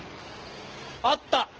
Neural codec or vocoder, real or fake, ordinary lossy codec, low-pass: none; real; Opus, 16 kbps; 7.2 kHz